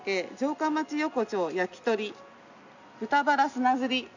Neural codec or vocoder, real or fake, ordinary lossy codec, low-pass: none; real; none; 7.2 kHz